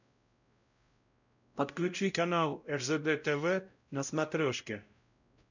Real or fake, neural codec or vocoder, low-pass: fake; codec, 16 kHz, 0.5 kbps, X-Codec, WavLM features, trained on Multilingual LibriSpeech; 7.2 kHz